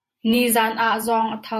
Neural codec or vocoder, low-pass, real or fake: vocoder, 48 kHz, 128 mel bands, Vocos; 14.4 kHz; fake